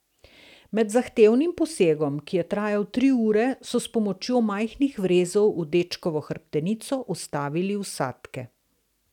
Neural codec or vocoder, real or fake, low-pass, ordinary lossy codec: none; real; 19.8 kHz; none